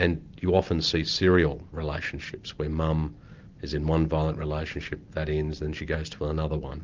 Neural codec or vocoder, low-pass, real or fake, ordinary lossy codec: none; 7.2 kHz; real; Opus, 24 kbps